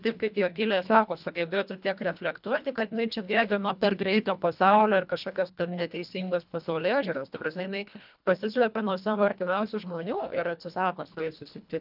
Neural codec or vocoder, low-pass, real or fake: codec, 24 kHz, 1.5 kbps, HILCodec; 5.4 kHz; fake